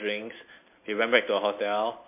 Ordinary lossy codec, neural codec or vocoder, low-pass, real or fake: MP3, 24 kbps; vocoder, 44.1 kHz, 128 mel bands every 256 samples, BigVGAN v2; 3.6 kHz; fake